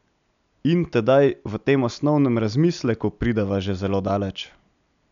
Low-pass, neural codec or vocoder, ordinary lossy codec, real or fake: 7.2 kHz; none; none; real